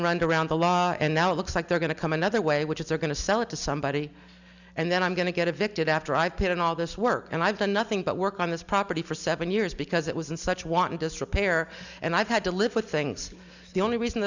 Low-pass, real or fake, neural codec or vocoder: 7.2 kHz; real; none